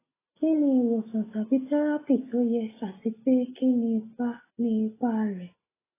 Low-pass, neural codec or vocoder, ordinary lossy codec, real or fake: 3.6 kHz; none; AAC, 16 kbps; real